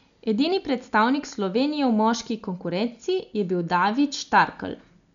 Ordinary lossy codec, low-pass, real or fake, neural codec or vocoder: none; 7.2 kHz; real; none